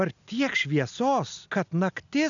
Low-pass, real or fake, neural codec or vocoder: 7.2 kHz; real; none